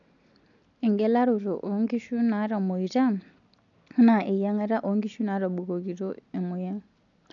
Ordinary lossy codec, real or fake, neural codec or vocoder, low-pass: none; real; none; 7.2 kHz